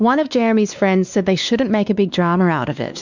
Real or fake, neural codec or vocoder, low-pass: fake; codec, 16 kHz, 2 kbps, X-Codec, WavLM features, trained on Multilingual LibriSpeech; 7.2 kHz